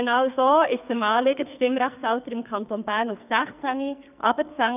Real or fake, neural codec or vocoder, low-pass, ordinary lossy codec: fake; codec, 44.1 kHz, 2.6 kbps, SNAC; 3.6 kHz; none